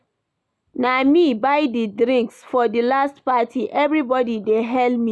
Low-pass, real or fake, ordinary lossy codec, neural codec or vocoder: none; real; none; none